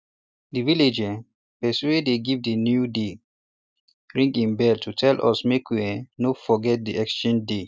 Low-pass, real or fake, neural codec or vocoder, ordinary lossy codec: none; real; none; none